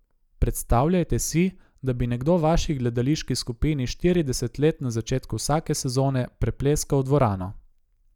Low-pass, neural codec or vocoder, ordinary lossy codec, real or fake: 19.8 kHz; none; none; real